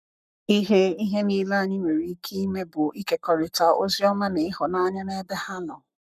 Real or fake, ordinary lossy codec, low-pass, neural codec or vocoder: fake; none; 14.4 kHz; codec, 44.1 kHz, 7.8 kbps, Pupu-Codec